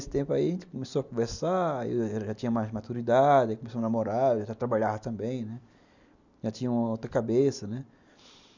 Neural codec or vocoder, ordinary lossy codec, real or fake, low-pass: none; none; real; 7.2 kHz